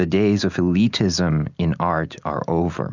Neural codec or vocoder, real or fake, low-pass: none; real; 7.2 kHz